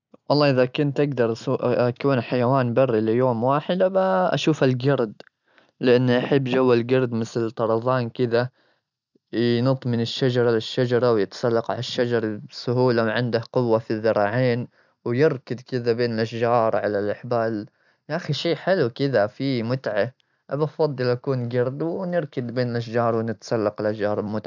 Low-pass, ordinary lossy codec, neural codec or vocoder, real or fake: 7.2 kHz; none; none; real